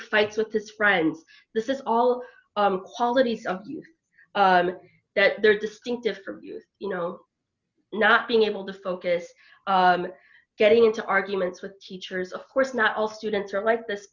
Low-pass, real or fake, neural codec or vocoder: 7.2 kHz; real; none